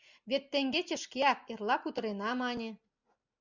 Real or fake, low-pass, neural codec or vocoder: real; 7.2 kHz; none